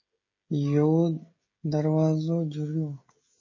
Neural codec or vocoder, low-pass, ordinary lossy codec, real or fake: codec, 16 kHz, 16 kbps, FreqCodec, smaller model; 7.2 kHz; MP3, 32 kbps; fake